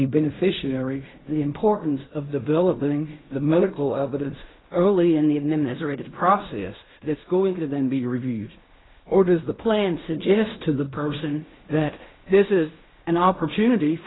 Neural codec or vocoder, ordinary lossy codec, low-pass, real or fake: codec, 16 kHz in and 24 kHz out, 0.4 kbps, LongCat-Audio-Codec, fine tuned four codebook decoder; AAC, 16 kbps; 7.2 kHz; fake